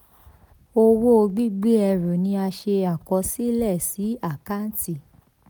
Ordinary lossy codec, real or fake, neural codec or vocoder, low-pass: none; real; none; none